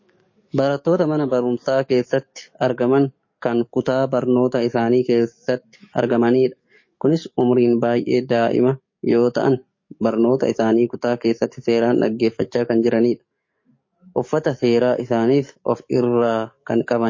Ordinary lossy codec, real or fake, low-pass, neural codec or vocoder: MP3, 32 kbps; fake; 7.2 kHz; codec, 44.1 kHz, 7.8 kbps, DAC